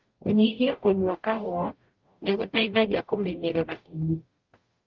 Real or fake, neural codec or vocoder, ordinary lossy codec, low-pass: fake; codec, 44.1 kHz, 0.9 kbps, DAC; Opus, 32 kbps; 7.2 kHz